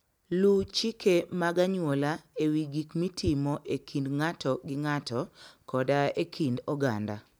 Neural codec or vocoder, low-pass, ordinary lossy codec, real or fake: none; none; none; real